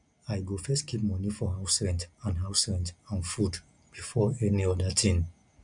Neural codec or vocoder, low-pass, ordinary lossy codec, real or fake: none; 9.9 kHz; none; real